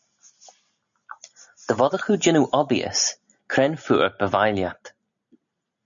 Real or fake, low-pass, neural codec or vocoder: real; 7.2 kHz; none